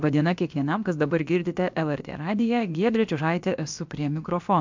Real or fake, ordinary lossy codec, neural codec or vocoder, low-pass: fake; MP3, 64 kbps; codec, 16 kHz, about 1 kbps, DyCAST, with the encoder's durations; 7.2 kHz